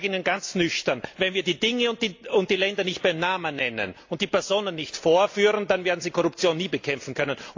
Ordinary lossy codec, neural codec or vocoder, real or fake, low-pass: AAC, 48 kbps; none; real; 7.2 kHz